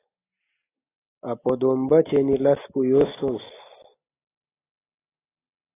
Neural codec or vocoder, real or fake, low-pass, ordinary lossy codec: none; real; 3.6 kHz; AAC, 16 kbps